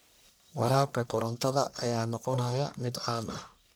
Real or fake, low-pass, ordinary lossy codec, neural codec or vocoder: fake; none; none; codec, 44.1 kHz, 1.7 kbps, Pupu-Codec